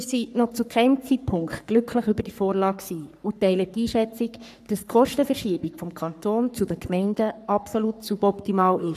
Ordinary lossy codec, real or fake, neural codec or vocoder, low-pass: none; fake; codec, 44.1 kHz, 3.4 kbps, Pupu-Codec; 14.4 kHz